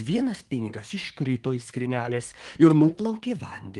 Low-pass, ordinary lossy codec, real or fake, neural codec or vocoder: 10.8 kHz; Opus, 24 kbps; fake; codec, 24 kHz, 1 kbps, SNAC